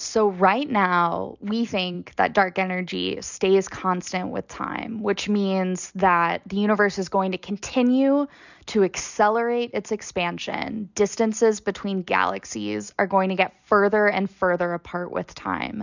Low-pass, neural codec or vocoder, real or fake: 7.2 kHz; none; real